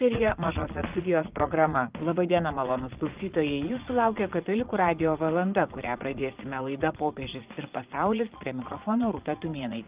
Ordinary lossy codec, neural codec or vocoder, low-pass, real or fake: Opus, 24 kbps; vocoder, 22.05 kHz, 80 mel bands, Vocos; 3.6 kHz; fake